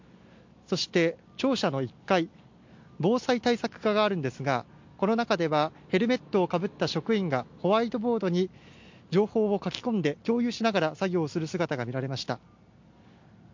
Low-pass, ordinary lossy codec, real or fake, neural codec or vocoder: 7.2 kHz; MP3, 48 kbps; real; none